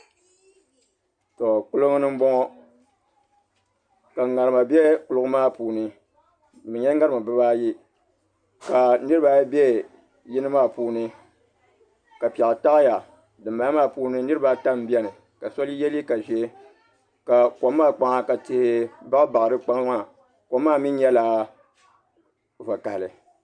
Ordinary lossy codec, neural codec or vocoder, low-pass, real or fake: Opus, 64 kbps; none; 9.9 kHz; real